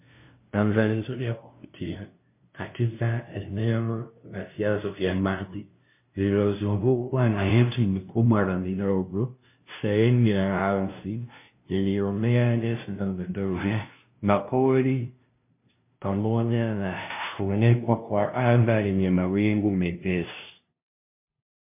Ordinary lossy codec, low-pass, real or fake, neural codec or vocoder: MP3, 24 kbps; 3.6 kHz; fake; codec, 16 kHz, 0.5 kbps, FunCodec, trained on LibriTTS, 25 frames a second